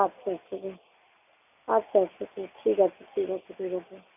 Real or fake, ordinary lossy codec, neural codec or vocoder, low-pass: real; none; none; 3.6 kHz